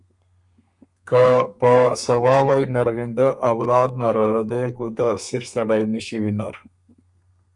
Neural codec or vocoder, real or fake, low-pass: codec, 32 kHz, 1.9 kbps, SNAC; fake; 10.8 kHz